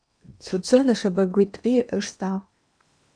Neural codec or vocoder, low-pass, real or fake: codec, 16 kHz in and 24 kHz out, 0.8 kbps, FocalCodec, streaming, 65536 codes; 9.9 kHz; fake